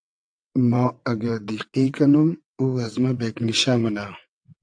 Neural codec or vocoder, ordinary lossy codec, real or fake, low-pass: vocoder, 22.05 kHz, 80 mel bands, WaveNeXt; AAC, 64 kbps; fake; 9.9 kHz